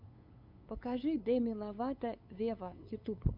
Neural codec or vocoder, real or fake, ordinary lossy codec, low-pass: codec, 16 kHz, 8 kbps, FunCodec, trained on LibriTTS, 25 frames a second; fake; MP3, 48 kbps; 5.4 kHz